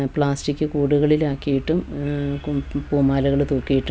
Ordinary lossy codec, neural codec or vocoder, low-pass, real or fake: none; none; none; real